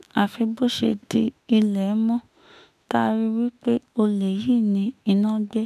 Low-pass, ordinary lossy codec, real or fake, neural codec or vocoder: 14.4 kHz; none; fake; autoencoder, 48 kHz, 32 numbers a frame, DAC-VAE, trained on Japanese speech